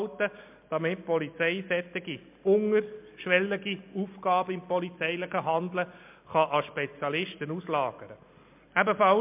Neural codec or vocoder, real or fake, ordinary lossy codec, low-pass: none; real; MP3, 32 kbps; 3.6 kHz